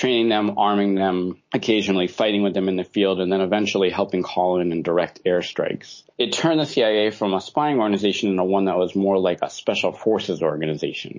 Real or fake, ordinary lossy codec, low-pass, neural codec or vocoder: real; MP3, 32 kbps; 7.2 kHz; none